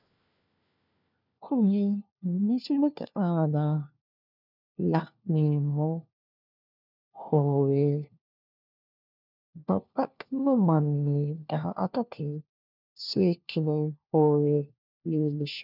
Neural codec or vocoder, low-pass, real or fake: codec, 16 kHz, 1 kbps, FunCodec, trained on LibriTTS, 50 frames a second; 5.4 kHz; fake